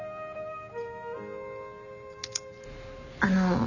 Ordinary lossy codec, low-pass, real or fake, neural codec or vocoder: none; 7.2 kHz; real; none